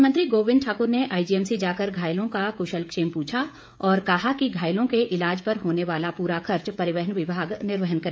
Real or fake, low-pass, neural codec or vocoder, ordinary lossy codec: fake; none; codec, 16 kHz, 16 kbps, FreqCodec, smaller model; none